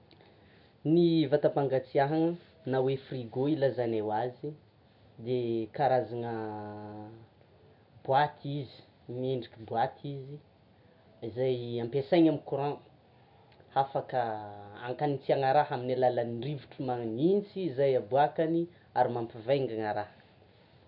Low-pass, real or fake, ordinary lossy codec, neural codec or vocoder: 5.4 kHz; real; none; none